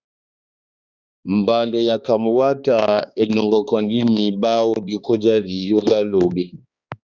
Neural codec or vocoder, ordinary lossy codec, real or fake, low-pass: codec, 16 kHz, 2 kbps, X-Codec, HuBERT features, trained on balanced general audio; Opus, 64 kbps; fake; 7.2 kHz